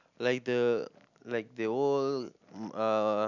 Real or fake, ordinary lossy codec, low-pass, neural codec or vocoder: real; none; 7.2 kHz; none